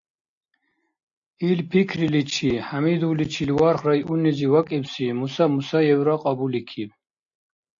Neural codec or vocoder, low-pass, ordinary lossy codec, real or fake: none; 7.2 kHz; AAC, 64 kbps; real